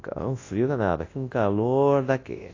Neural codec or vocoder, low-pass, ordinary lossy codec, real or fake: codec, 24 kHz, 0.9 kbps, WavTokenizer, large speech release; 7.2 kHz; AAC, 32 kbps; fake